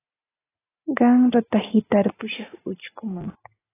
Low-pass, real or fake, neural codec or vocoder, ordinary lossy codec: 3.6 kHz; real; none; AAC, 16 kbps